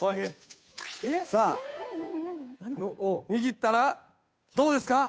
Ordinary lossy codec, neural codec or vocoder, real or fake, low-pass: none; codec, 16 kHz, 2 kbps, FunCodec, trained on Chinese and English, 25 frames a second; fake; none